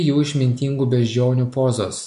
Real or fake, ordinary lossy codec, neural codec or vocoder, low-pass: real; MP3, 64 kbps; none; 10.8 kHz